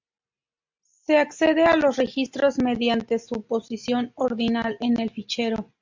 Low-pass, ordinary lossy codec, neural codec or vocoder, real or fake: 7.2 kHz; MP3, 64 kbps; none; real